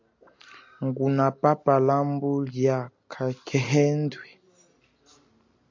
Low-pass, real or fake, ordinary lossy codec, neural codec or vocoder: 7.2 kHz; real; MP3, 48 kbps; none